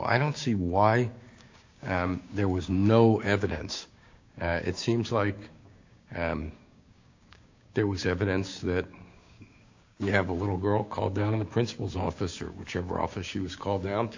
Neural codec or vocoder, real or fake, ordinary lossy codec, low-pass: codec, 16 kHz, 6 kbps, DAC; fake; AAC, 48 kbps; 7.2 kHz